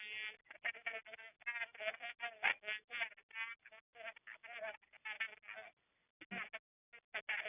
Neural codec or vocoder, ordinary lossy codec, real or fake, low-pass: none; none; real; 3.6 kHz